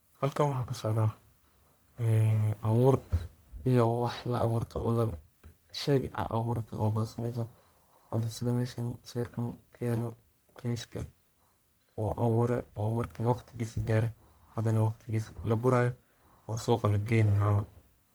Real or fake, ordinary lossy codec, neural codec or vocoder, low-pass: fake; none; codec, 44.1 kHz, 1.7 kbps, Pupu-Codec; none